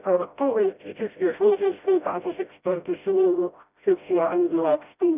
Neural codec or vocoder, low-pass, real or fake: codec, 16 kHz, 0.5 kbps, FreqCodec, smaller model; 3.6 kHz; fake